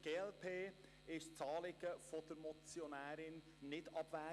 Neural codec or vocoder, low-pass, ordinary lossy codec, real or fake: none; none; none; real